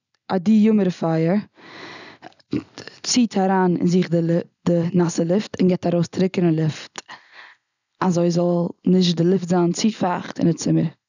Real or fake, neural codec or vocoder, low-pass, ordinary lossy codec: real; none; 7.2 kHz; none